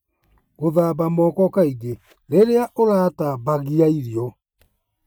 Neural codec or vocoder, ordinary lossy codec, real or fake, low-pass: none; none; real; none